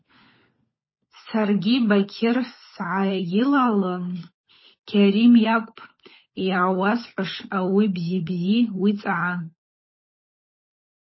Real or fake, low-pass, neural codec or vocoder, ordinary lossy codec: fake; 7.2 kHz; codec, 16 kHz, 16 kbps, FunCodec, trained on LibriTTS, 50 frames a second; MP3, 24 kbps